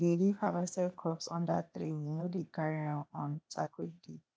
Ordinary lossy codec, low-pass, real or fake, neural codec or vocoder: none; none; fake; codec, 16 kHz, 0.8 kbps, ZipCodec